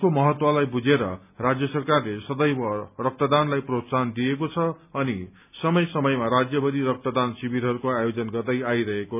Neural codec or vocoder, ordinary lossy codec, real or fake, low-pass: none; none; real; 3.6 kHz